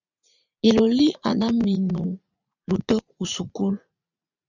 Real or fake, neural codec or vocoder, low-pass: fake; vocoder, 44.1 kHz, 80 mel bands, Vocos; 7.2 kHz